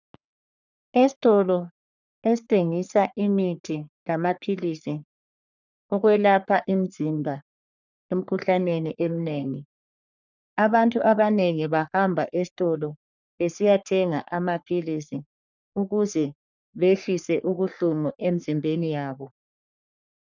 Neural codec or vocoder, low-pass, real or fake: codec, 44.1 kHz, 3.4 kbps, Pupu-Codec; 7.2 kHz; fake